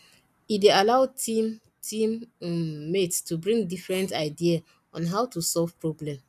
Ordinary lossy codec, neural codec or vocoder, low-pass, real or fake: none; none; 14.4 kHz; real